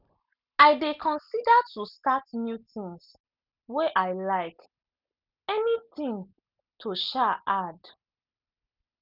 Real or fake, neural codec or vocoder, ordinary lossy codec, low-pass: real; none; none; 5.4 kHz